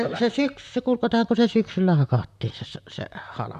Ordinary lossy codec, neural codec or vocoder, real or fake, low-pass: none; vocoder, 44.1 kHz, 128 mel bands, Pupu-Vocoder; fake; 14.4 kHz